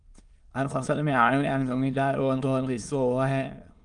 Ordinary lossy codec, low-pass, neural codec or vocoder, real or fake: Opus, 24 kbps; 9.9 kHz; autoencoder, 22.05 kHz, a latent of 192 numbers a frame, VITS, trained on many speakers; fake